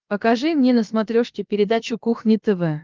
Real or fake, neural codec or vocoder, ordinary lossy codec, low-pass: fake; codec, 16 kHz, about 1 kbps, DyCAST, with the encoder's durations; Opus, 24 kbps; 7.2 kHz